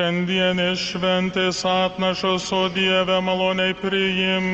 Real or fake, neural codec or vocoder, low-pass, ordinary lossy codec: real; none; 7.2 kHz; Opus, 24 kbps